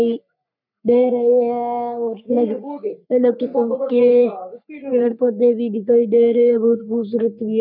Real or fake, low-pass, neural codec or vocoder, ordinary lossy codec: fake; 5.4 kHz; codec, 44.1 kHz, 3.4 kbps, Pupu-Codec; none